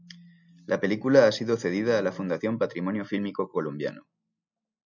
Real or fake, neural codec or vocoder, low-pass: real; none; 7.2 kHz